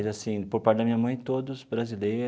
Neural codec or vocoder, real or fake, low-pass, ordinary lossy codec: none; real; none; none